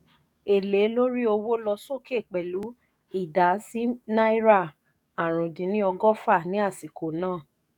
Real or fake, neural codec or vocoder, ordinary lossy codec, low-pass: fake; codec, 44.1 kHz, 7.8 kbps, DAC; none; 19.8 kHz